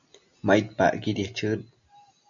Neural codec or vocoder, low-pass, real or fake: none; 7.2 kHz; real